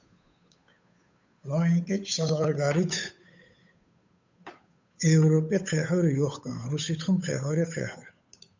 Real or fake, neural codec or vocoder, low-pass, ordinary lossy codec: fake; codec, 16 kHz, 8 kbps, FunCodec, trained on Chinese and English, 25 frames a second; 7.2 kHz; MP3, 64 kbps